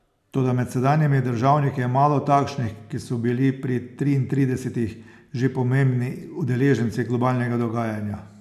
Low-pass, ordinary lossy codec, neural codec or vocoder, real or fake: 14.4 kHz; none; none; real